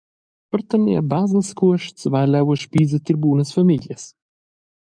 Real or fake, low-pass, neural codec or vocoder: fake; 9.9 kHz; codec, 44.1 kHz, 7.8 kbps, DAC